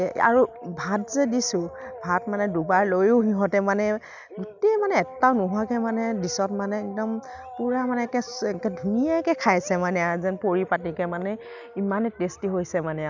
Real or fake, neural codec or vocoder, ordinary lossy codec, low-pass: real; none; none; 7.2 kHz